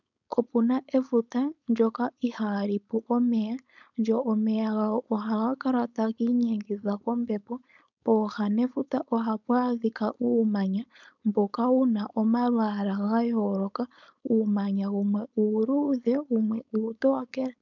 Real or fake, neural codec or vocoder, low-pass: fake; codec, 16 kHz, 4.8 kbps, FACodec; 7.2 kHz